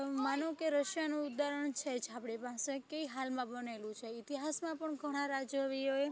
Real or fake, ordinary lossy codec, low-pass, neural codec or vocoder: real; none; none; none